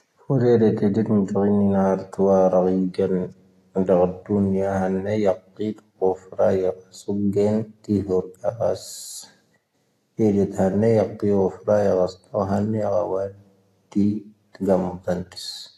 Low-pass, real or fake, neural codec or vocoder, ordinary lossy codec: 14.4 kHz; real; none; AAC, 48 kbps